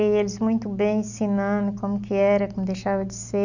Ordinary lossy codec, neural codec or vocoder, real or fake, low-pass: none; none; real; 7.2 kHz